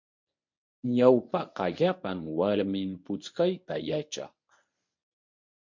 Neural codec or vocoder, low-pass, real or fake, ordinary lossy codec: codec, 24 kHz, 0.9 kbps, WavTokenizer, medium speech release version 1; 7.2 kHz; fake; MP3, 48 kbps